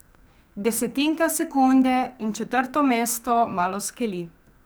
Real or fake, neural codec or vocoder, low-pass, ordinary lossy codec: fake; codec, 44.1 kHz, 2.6 kbps, SNAC; none; none